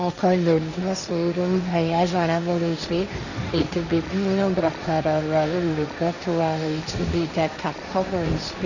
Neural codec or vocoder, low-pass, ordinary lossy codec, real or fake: codec, 16 kHz, 1.1 kbps, Voila-Tokenizer; 7.2 kHz; none; fake